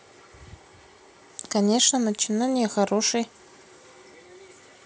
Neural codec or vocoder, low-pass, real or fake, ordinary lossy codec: none; none; real; none